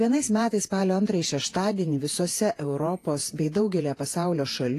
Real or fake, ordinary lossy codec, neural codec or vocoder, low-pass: fake; AAC, 48 kbps; vocoder, 48 kHz, 128 mel bands, Vocos; 14.4 kHz